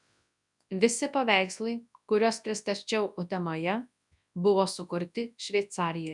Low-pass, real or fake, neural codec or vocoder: 10.8 kHz; fake; codec, 24 kHz, 0.9 kbps, WavTokenizer, large speech release